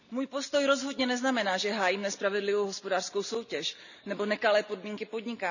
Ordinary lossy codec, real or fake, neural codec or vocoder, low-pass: none; real; none; 7.2 kHz